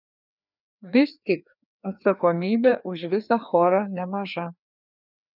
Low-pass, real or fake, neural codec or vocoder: 5.4 kHz; fake; codec, 16 kHz, 2 kbps, FreqCodec, larger model